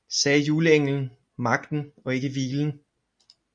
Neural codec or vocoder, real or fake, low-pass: none; real; 9.9 kHz